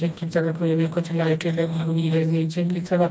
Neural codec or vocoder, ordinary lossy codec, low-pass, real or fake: codec, 16 kHz, 1 kbps, FreqCodec, smaller model; none; none; fake